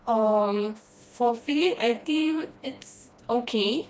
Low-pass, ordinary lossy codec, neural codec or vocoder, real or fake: none; none; codec, 16 kHz, 1 kbps, FreqCodec, smaller model; fake